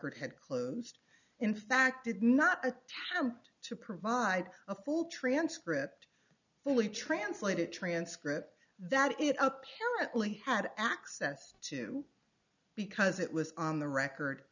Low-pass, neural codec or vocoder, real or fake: 7.2 kHz; none; real